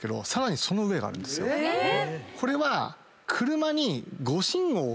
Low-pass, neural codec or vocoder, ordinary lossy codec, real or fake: none; none; none; real